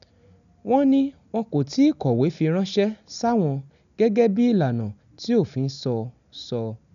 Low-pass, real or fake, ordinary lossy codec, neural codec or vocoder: 7.2 kHz; real; none; none